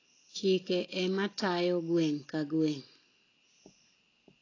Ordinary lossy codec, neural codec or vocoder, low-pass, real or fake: AAC, 32 kbps; none; 7.2 kHz; real